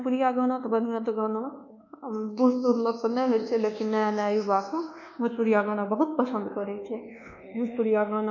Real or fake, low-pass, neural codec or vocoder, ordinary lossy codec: fake; 7.2 kHz; codec, 24 kHz, 1.2 kbps, DualCodec; none